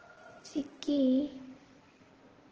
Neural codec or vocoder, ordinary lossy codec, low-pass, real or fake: none; Opus, 16 kbps; 7.2 kHz; real